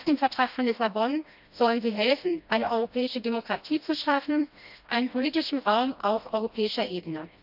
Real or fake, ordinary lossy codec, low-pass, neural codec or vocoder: fake; AAC, 48 kbps; 5.4 kHz; codec, 16 kHz, 1 kbps, FreqCodec, smaller model